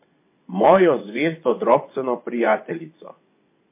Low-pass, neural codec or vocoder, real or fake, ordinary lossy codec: 3.6 kHz; vocoder, 22.05 kHz, 80 mel bands, WaveNeXt; fake; MP3, 24 kbps